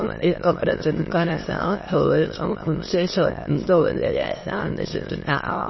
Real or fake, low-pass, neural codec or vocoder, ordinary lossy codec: fake; 7.2 kHz; autoencoder, 22.05 kHz, a latent of 192 numbers a frame, VITS, trained on many speakers; MP3, 24 kbps